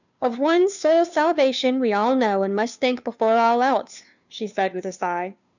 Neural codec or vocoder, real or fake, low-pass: codec, 16 kHz, 2 kbps, FunCodec, trained on Chinese and English, 25 frames a second; fake; 7.2 kHz